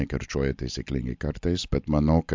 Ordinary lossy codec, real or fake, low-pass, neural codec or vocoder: MP3, 64 kbps; real; 7.2 kHz; none